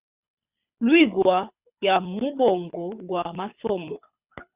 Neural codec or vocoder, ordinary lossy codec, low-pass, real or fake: codec, 24 kHz, 6 kbps, HILCodec; Opus, 64 kbps; 3.6 kHz; fake